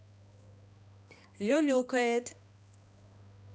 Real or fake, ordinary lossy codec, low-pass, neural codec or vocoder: fake; none; none; codec, 16 kHz, 1 kbps, X-Codec, HuBERT features, trained on balanced general audio